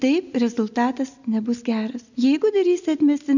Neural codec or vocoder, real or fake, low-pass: none; real; 7.2 kHz